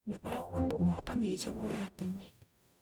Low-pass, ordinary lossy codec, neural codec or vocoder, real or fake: none; none; codec, 44.1 kHz, 0.9 kbps, DAC; fake